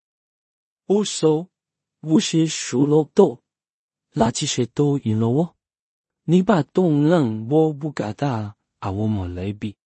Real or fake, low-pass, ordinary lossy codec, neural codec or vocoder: fake; 10.8 kHz; MP3, 32 kbps; codec, 16 kHz in and 24 kHz out, 0.4 kbps, LongCat-Audio-Codec, two codebook decoder